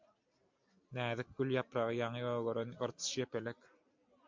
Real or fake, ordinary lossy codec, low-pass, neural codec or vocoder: real; MP3, 64 kbps; 7.2 kHz; none